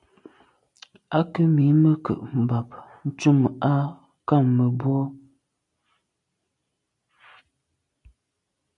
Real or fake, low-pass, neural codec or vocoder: real; 10.8 kHz; none